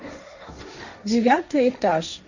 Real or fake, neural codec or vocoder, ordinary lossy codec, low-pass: fake; codec, 16 kHz, 1.1 kbps, Voila-Tokenizer; none; 7.2 kHz